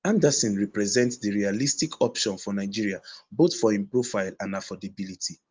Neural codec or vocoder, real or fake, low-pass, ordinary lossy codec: none; real; 7.2 kHz; Opus, 32 kbps